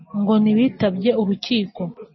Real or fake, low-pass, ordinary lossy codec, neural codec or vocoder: real; 7.2 kHz; MP3, 24 kbps; none